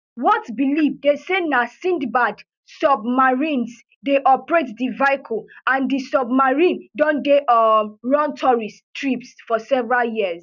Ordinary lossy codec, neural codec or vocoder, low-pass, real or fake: none; none; 7.2 kHz; real